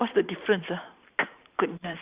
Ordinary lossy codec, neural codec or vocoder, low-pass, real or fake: Opus, 64 kbps; vocoder, 44.1 kHz, 128 mel bands every 512 samples, BigVGAN v2; 3.6 kHz; fake